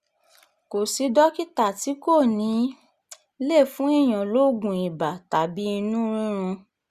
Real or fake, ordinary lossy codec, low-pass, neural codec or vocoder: real; Opus, 64 kbps; 14.4 kHz; none